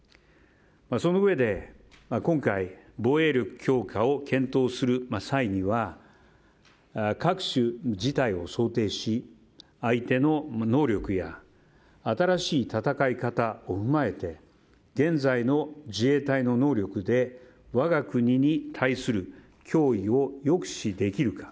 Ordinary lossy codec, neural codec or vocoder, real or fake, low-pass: none; none; real; none